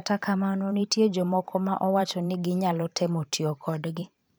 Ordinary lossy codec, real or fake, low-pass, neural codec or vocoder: none; fake; none; vocoder, 44.1 kHz, 128 mel bands every 512 samples, BigVGAN v2